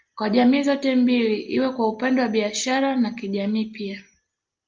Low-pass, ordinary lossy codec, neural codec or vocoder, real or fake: 7.2 kHz; Opus, 24 kbps; none; real